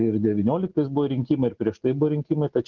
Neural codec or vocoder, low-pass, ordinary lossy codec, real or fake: none; 7.2 kHz; Opus, 16 kbps; real